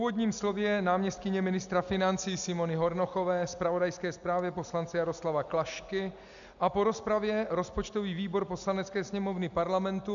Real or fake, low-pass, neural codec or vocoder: real; 7.2 kHz; none